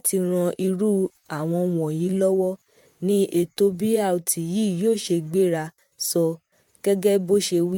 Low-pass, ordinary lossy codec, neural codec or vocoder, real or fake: 19.8 kHz; MP3, 96 kbps; vocoder, 44.1 kHz, 128 mel bands, Pupu-Vocoder; fake